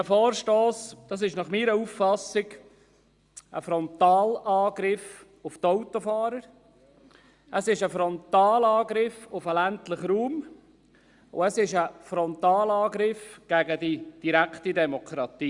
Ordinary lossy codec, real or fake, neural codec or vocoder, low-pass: Opus, 64 kbps; real; none; 10.8 kHz